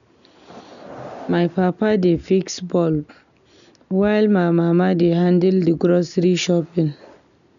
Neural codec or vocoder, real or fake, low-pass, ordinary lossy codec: none; real; 7.2 kHz; none